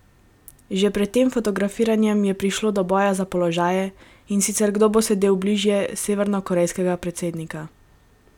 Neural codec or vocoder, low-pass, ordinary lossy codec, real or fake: none; 19.8 kHz; none; real